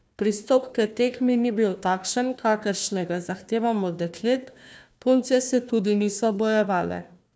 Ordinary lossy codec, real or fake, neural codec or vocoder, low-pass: none; fake; codec, 16 kHz, 1 kbps, FunCodec, trained on Chinese and English, 50 frames a second; none